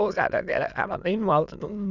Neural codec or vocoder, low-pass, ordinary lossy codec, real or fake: autoencoder, 22.05 kHz, a latent of 192 numbers a frame, VITS, trained on many speakers; 7.2 kHz; none; fake